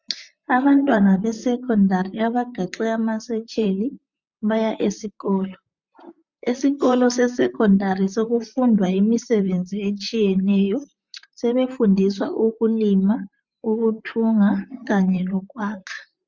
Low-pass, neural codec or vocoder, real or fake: 7.2 kHz; vocoder, 44.1 kHz, 128 mel bands, Pupu-Vocoder; fake